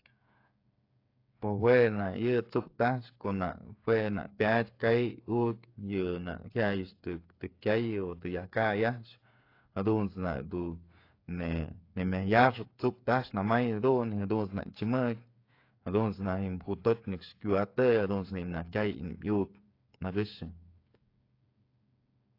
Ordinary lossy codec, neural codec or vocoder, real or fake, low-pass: AAC, 32 kbps; codec, 16 kHz, 8 kbps, FreqCodec, smaller model; fake; 5.4 kHz